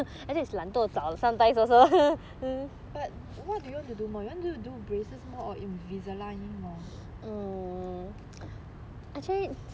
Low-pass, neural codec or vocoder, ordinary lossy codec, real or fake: none; none; none; real